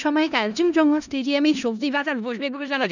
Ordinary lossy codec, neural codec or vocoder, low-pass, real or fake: none; codec, 16 kHz in and 24 kHz out, 0.4 kbps, LongCat-Audio-Codec, four codebook decoder; 7.2 kHz; fake